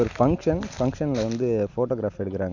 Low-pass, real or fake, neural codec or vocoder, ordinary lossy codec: 7.2 kHz; real; none; none